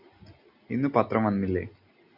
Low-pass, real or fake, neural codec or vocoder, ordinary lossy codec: 5.4 kHz; real; none; Opus, 64 kbps